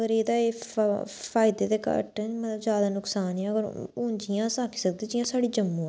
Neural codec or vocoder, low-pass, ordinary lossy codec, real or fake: none; none; none; real